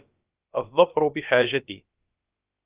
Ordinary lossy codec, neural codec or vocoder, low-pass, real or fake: Opus, 24 kbps; codec, 16 kHz, about 1 kbps, DyCAST, with the encoder's durations; 3.6 kHz; fake